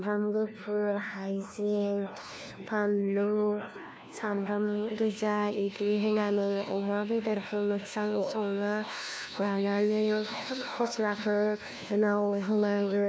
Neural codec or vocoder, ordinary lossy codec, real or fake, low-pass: codec, 16 kHz, 1 kbps, FunCodec, trained on LibriTTS, 50 frames a second; none; fake; none